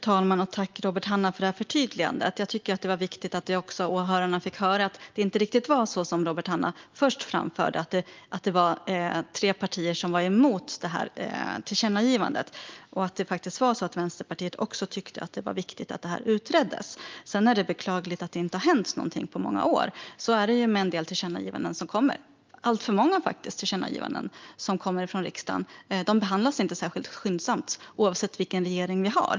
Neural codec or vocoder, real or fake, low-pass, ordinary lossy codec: none; real; 7.2 kHz; Opus, 24 kbps